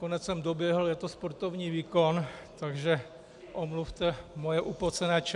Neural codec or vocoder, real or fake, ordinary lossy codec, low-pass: none; real; MP3, 96 kbps; 10.8 kHz